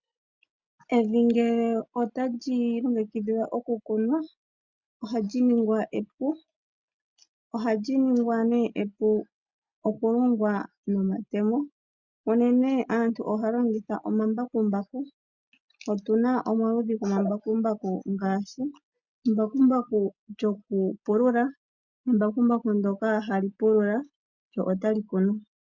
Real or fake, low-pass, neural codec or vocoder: real; 7.2 kHz; none